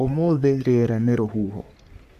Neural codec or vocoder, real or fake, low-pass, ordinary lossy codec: codec, 44.1 kHz, 3.4 kbps, Pupu-Codec; fake; 14.4 kHz; none